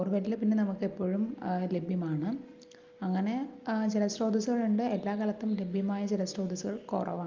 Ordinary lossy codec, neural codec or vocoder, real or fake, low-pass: Opus, 32 kbps; none; real; 7.2 kHz